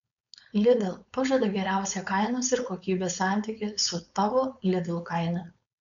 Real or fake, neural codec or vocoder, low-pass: fake; codec, 16 kHz, 4.8 kbps, FACodec; 7.2 kHz